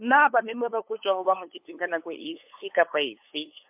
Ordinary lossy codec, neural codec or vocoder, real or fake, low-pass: MP3, 32 kbps; codec, 16 kHz, 8 kbps, FunCodec, trained on LibriTTS, 25 frames a second; fake; 3.6 kHz